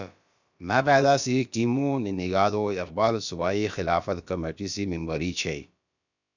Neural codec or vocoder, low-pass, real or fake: codec, 16 kHz, about 1 kbps, DyCAST, with the encoder's durations; 7.2 kHz; fake